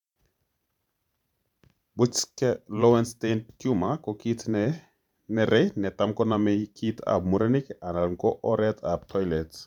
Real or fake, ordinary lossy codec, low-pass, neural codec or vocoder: fake; none; 19.8 kHz; vocoder, 44.1 kHz, 128 mel bands every 512 samples, BigVGAN v2